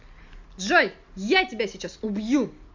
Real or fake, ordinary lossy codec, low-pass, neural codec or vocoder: real; MP3, 64 kbps; 7.2 kHz; none